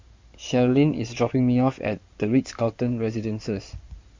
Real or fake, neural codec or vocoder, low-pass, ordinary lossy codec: fake; codec, 16 kHz in and 24 kHz out, 2.2 kbps, FireRedTTS-2 codec; 7.2 kHz; MP3, 64 kbps